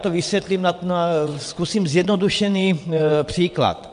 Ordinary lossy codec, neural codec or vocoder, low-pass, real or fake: MP3, 64 kbps; vocoder, 22.05 kHz, 80 mel bands, WaveNeXt; 9.9 kHz; fake